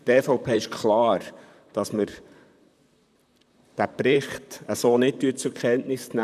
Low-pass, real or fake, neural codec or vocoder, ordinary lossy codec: 14.4 kHz; fake; vocoder, 44.1 kHz, 128 mel bands, Pupu-Vocoder; none